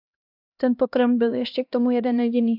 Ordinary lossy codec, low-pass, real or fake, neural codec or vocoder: AAC, 48 kbps; 5.4 kHz; fake; codec, 16 kHz, 1 kbps, X-Codec, HuBERT features, trained on LibriSpeech